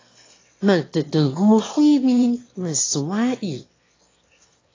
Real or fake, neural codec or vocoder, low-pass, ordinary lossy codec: fake; autoencoder, 22.05 kHz, a latent of 192 numbers a frame, VITS, trained on one speaker; 7.2 kHz; AAC, 32 kbps